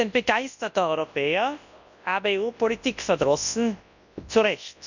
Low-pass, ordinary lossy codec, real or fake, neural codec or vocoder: 7.2 kHz; none; fake; codec, 24 kHz, 0.9 kbps, WavTokenizer, large speech release